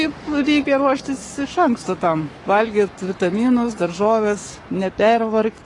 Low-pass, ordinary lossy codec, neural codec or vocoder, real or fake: 10.8 kHz; AAC, 32 kbps; codec, 44.1 kHz, 7.8 kbps, Pupu-Codec; fake